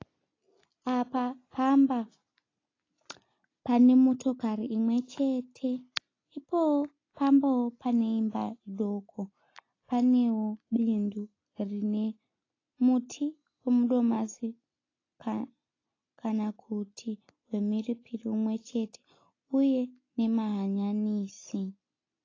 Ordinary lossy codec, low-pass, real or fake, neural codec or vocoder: AAC, 32 kbps; 7.2 kHz; real; none